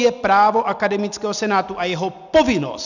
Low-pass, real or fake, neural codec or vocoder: 7.2 kHz; real; none